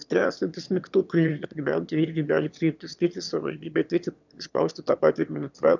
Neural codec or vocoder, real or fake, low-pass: autoencoder, 22.05 kHz, a latent of 192 numbers a frame, VITS, trained on one speaker; fake; 7.2 kHz